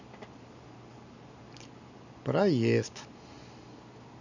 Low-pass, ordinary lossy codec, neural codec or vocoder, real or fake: 7.2 kHz; none; none; real